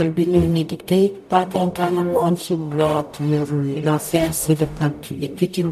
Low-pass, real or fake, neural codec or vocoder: 14.4 kHz; fake; codec, 44.1 kHz, 0.9 kbps, DAC